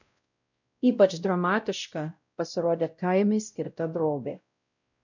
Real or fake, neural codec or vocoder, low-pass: fake; codec, 16 kHz, 0.5 kbps, X-Codec, WavLM features, trained on Multilingual LibriSpeech; 7.2 kHz